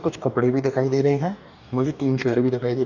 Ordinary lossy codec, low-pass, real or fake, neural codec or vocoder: none; 7.2 kHz; fake; codec, 44.1 kHz, 2.6 kbps, DAC